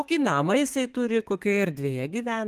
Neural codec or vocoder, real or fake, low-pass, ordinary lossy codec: codec, 32 kHz, 1.9 kbps, SNAC; fake; 14.4 kHz; Opus, 32 kbps